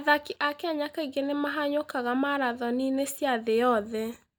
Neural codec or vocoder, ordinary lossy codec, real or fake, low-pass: none; none; real; none